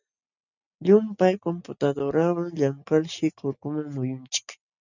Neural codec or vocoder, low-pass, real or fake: none; 7.2 kHz; real